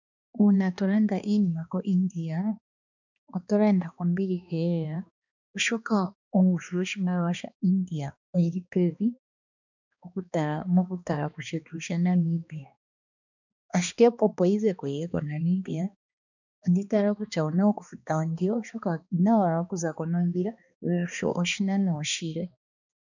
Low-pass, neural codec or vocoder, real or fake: 7.2 kHz; codec, 16 kHz, 2 kbps, X-Codec, HuBERT features, trained on balanced general audio; fake